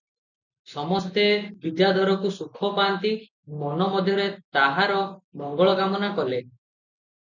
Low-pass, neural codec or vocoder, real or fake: 7.2 kHz; none; real